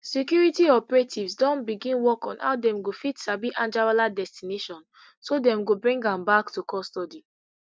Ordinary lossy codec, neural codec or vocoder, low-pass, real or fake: none; none; none; real